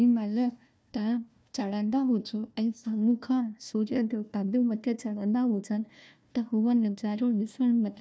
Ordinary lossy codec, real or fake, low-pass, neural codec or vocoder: none; fake; none; codec, 16 kHz, 1 kbps, FunCodec, trained on Chinese and English, 50 frames a second